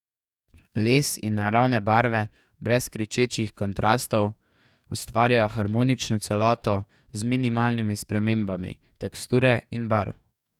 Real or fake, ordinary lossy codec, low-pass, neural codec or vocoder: fake; none; 19.8 kHz; codec, 44.1 kHz, 2.6 kbps, DAC